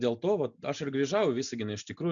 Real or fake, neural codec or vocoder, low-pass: real; none; 7.2 kHz